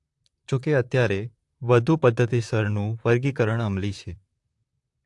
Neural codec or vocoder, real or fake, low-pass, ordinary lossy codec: vocoder, 44.1 kHz, 128 mel bands, Pupu-Vocoder; fake; 10.8 kHz; AAC, 64 kbps